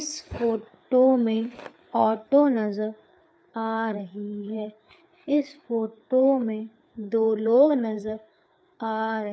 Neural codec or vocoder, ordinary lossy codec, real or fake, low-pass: codec, 16 kHz, 4 kbps, FreqCodec, larger model; none; fake; none